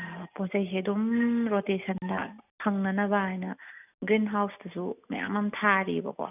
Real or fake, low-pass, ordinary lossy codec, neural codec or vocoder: real; 3.6 kHz; none; none